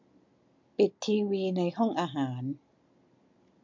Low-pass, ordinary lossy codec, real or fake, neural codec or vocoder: 7.2 kHz; MP3, 48 kbps; real; none